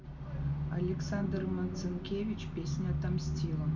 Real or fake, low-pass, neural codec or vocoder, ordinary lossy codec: real; 7.2 kHz; none; none